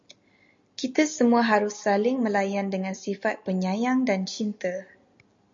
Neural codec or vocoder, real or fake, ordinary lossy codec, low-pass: none; real; MP3, 48 kbps; 7.2 kHz